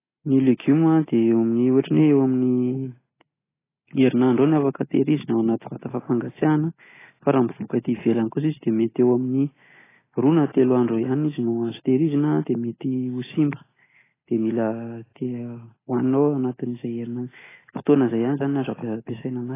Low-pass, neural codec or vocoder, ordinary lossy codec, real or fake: 3.6 kHz; none; AAC, 16 kbps; real